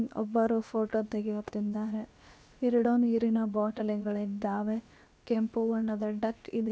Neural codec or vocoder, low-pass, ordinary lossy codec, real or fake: codec, 16 kHz, about 1 kbps, DyCAST, with the encoder's durations; none; none; fake